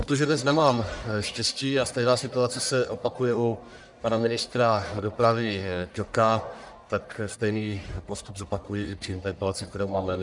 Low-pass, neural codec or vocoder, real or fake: 10.8 kHz; codec, 44.1 kHz, 1.7 kbps, Pupu-Codec; fake